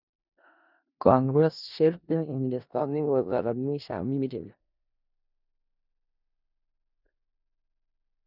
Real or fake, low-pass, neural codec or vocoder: fake; 5.4 kHz; codec, 16 kHz in and 24 kHz out, 0.4 kbps, LongCat-Audio-Codec, four codebook decoder